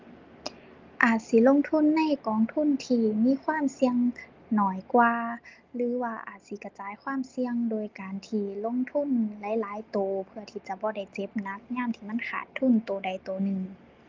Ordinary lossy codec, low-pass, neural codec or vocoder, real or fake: Opus, 24 kbps; 7.2 kHz; none; real